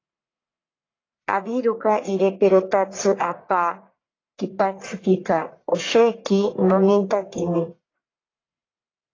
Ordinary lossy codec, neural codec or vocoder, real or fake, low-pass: AAC, 32 kbps; codec, 44.1 kHz, 1.7 kbps, Pupu-Codec; fake; 7.2 kHz